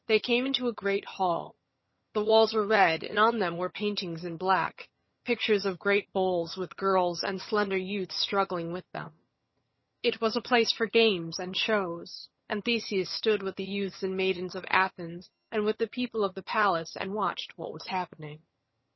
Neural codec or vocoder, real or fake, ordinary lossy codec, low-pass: vocoder, 22.05 kHz, 80 mel bands, HiFi-GAN; fake; MP3, 24 kbps; 7.2 kHz